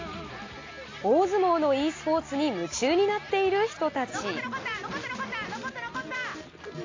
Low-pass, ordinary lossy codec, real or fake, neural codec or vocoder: 7.2 kHz; AAC, 32 kbps; real; none